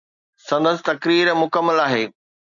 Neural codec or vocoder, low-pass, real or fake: none; 7.2 kHz; real